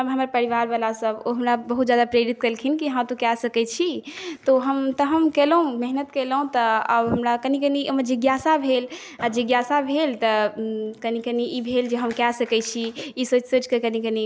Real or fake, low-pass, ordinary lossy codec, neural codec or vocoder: real; none; none; none